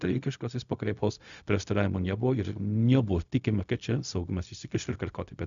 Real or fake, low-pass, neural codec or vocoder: fake; 7.2 kHz; codec, 16 kHz, 0.4 kbps, LongCat-Audio-Codec